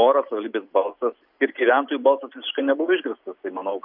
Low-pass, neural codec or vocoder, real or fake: 5.4 kHz; none; real